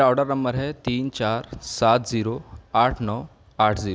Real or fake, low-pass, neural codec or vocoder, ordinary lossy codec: real; none; none; none